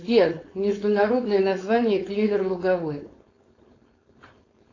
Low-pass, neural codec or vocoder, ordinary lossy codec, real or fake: 7.2 kHz; codec, 16 kHz, 4.8 kbps, FACodec; AAC, 32 kbps; fake